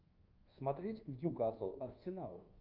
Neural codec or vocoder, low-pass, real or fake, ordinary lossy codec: codec, 24 kHz, 1.2 kbps, DualCodec; 5.4 kHz; fake; Opus, 24 kbps